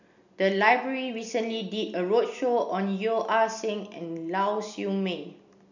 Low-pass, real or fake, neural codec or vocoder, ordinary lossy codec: 7.2 kHz; real; none; none